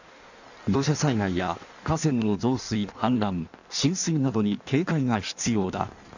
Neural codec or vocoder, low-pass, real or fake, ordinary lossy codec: codec, 16 kHz in and 24 kHz out, 1.1 kbps, FireRedTTS-2 codec; 7.2 kHz; fake; none